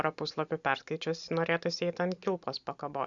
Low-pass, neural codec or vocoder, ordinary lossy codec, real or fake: 7.2 kHz; none; Opus, 64 kbps; real